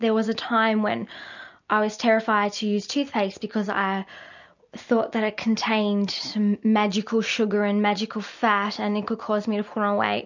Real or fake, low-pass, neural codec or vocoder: real; 7.2 kHz; none